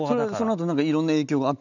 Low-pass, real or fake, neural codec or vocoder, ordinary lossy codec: 7.2 kHz; real; none; none